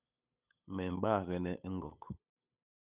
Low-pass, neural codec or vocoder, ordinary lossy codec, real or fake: 3.6 kHz; codec, 16 kHz, 8 kbps, FunCodec, trained on LibriTTS, 25 frames a second; Opus, 64 kbps; fake